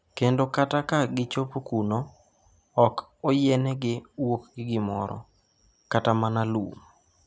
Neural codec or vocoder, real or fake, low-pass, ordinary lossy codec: none; real; none; none